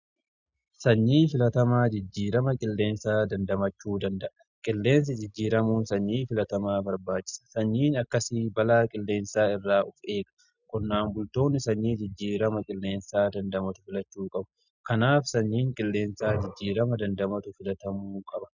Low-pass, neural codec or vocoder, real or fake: 7.2 kHz; none; real